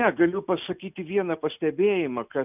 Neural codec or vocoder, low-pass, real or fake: none; 3.6 kHz; real